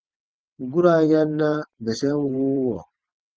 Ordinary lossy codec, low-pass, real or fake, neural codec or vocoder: Opus, 24 kbps; 7.2 kHz; fake; vocoder, 22.05 kHz, 80 mel bands, WaveNeXt